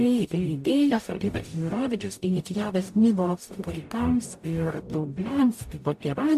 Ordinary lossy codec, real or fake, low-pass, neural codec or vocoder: MP3, 64 kbps; fake; 14.4 kHz; codec, 44.1 kHz, 0.9 kbps, DAC